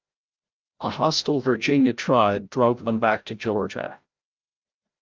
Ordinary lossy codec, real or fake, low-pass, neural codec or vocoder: Opus, 24 kbps; fake; 7.2 kHz; codec, 16 kHz, 0.5 kbps, FreqCodec, larger model